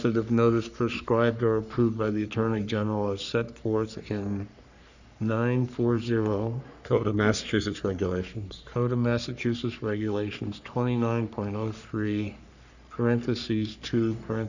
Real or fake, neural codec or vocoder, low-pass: fake; codec, 44.1 kHz, 3.4 kbps, Pupu-Codec; 7.2 kHz